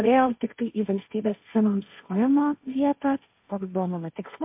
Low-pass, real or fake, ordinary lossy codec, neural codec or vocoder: 3.6 kHz; fake; MP3, 32 kbps; codec, 16 kHz, 1.1 kbps, Voila-Tokenizer